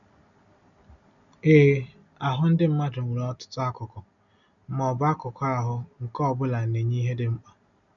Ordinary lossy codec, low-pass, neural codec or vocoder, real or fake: none; 7.2 kHz; none; real